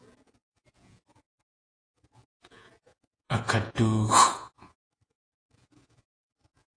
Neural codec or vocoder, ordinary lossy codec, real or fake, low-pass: vocoder, 48 kHz, 128 mel bands, Vocos; AAC, 32 kbps; fake; 9.9 kHz